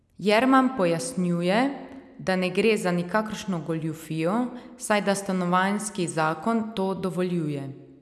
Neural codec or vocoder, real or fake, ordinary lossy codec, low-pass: none; real; none; none